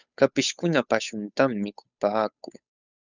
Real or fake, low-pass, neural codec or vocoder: fake; 7.2 kHz; codec, 16 kHz, 8 kbps, FunCodec, trained on Chinese and English, 25 frames a second